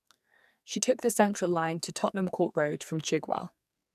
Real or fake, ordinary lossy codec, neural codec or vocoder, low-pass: fake; none; codec, 44.1 kHz, 2.6 kbps, SNAC; 14.4 kHz